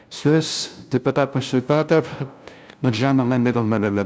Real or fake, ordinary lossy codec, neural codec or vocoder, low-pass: fake; none; codec, 16 kHz, 0.5 kbps, FunCodec, trained on LibriTTS, 25 frames a second; none